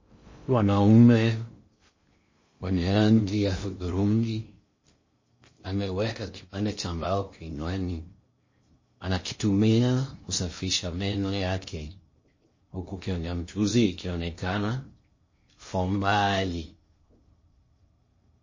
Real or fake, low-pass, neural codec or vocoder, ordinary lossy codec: fake; 7.2 kHz; codec, 16 kHz in and 24 kHz out, 0.6 kbps, FocalCodec, streaming, 2048 codes; MP3, 32 kbps